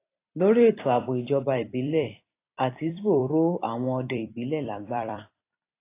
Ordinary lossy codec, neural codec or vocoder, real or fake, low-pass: AAC, 24 kbps; vocoder, 44.1 kHz, 128 mel bands every 256 samples, BigVGAN v2; fake; 3.6 kHz